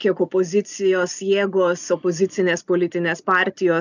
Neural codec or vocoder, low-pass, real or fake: none; 7.2 kHz; real